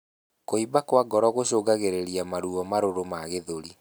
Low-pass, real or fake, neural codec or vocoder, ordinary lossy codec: none; real; none; none